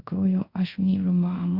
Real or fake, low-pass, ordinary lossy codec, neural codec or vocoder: fake; 5.4 kHz; Opus, 64 kbps; codec, 24 kHz, 0.5 kbps, DualCodec